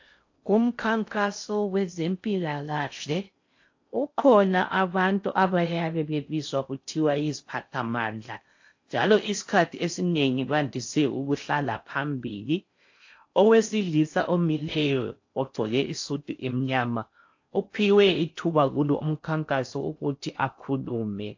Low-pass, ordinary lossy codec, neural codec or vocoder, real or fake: 7.2 kHz; AAC, 48 kbps; codec, 16 kHz in and 24 kHz out, 0.6 kbps, FocalCodec, streaming, 4096 codes; fake